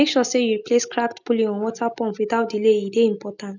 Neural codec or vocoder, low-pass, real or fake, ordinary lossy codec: none; 7.2 kHz; real; none